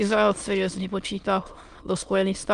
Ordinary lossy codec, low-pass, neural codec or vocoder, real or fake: Opus, 32 kbps; 9.9 kHz; autoencoder, 22.05 kHz, a latent of 192 numbers a frame, VITS, trained on many speakers; fake